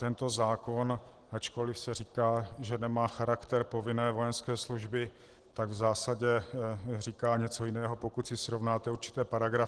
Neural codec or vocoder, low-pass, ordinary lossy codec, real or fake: none; 10.8 kHz; Opus, 16 kbps; real